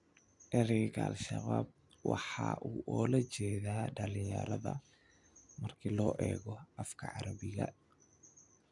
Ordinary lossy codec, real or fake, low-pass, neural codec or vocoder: none; real; 10.8 kHz; none